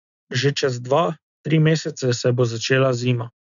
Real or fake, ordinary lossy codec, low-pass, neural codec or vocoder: real; none; 7.2 kHz; none